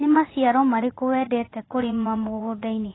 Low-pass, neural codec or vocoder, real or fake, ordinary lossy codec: 7.2 kHz; vocoder, 44.1 kHz, 80 mel bands, Vocos; fake; AAC, 16 kbps